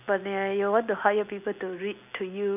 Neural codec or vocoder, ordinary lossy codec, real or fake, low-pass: none; none; real; 3.6 kHz